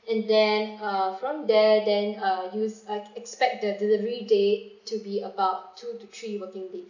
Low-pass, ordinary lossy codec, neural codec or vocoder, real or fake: 7.2 kHz; none; none; real